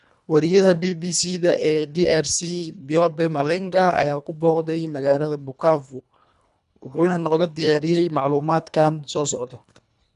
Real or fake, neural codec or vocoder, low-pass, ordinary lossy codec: fake; codec, 24 kHz, 1.5 kbps, HILCodec; 10.8 kHz; none